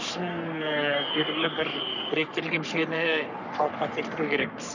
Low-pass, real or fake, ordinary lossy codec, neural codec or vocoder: 7.2 kHz; fake; none; codec, 44.1 kHz, 3.4 kbps, Pupu-Codec